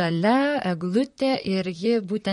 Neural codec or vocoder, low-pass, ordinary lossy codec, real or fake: vocoder, 44.1 kHz, 128 mel bands, Pupu-Vocoder; 19.8 kHz; MP3, 48 kbps; fake